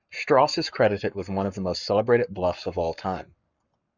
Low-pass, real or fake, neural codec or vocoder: 7.2 kHz; fake; codec, 44.1 kHz, 7.8 kbps, Pupu-Codec